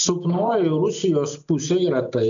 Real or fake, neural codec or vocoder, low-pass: real; none; 7.2 kHz